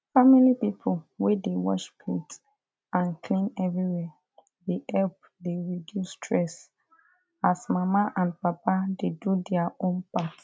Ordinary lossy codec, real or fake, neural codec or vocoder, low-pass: none; real; none; none